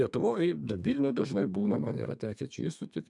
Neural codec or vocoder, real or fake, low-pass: codec, 32 kHz, 1.9 kbps, SNAC; fake; 10.8 kHz